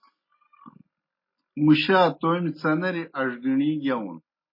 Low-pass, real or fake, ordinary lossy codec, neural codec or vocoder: 5.4 kHz; real; MP3, 24 kbps; none